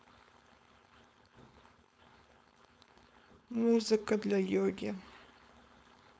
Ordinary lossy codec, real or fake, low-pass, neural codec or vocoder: none; fake; none; codec, 16 kHz, 4.8 kbps, FACodec